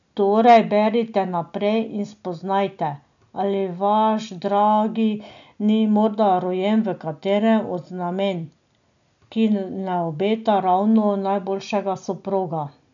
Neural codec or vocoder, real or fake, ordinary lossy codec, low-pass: none; real; none; 7.2 kHz